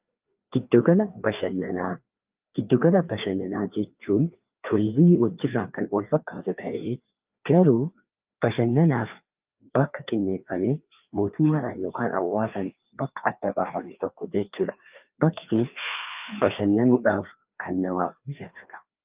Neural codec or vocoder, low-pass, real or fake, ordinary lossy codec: codec, 16 kHz, 2 kbps, FreqCodec, larger model; 3.6 kHz; fake; Opus, 24 kbps